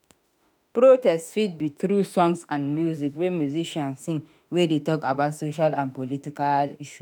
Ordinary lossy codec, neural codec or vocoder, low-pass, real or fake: none; autoencoder, 48 kHz, 32 numbers a frame, DAC-VAE, trained on Japanese speech; none; fake